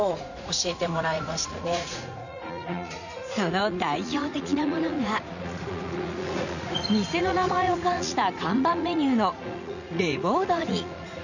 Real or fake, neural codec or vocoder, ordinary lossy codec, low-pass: fake; vocoder, 44.1 kHz, 80 mel bands, Vocos; none; 7.2 kHz